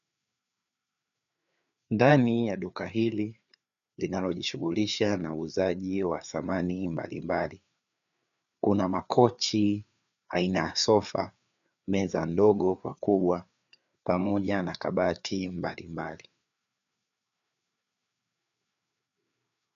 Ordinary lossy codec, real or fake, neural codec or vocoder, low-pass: MP3, 96 kbps; fake; codec, 16 kHz, 4 kbps, FreqCodec, larger model; 7.2 kHz